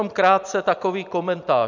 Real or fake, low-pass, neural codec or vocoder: fake; 7.2 kHz; vocoder, 44.1 kHz, 128 mel bands every 256 samples, BigVGAN v2